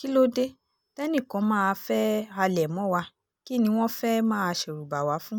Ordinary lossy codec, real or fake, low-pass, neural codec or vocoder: none; real; none; none